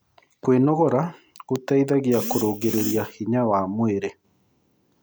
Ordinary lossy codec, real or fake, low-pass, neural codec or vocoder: none; real; none; none